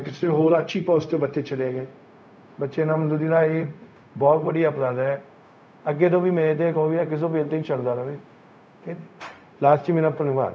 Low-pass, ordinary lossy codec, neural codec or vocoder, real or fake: none; none; codec, 16 kHz, 0.4 kbps, LongCat-Audio-Codec; fake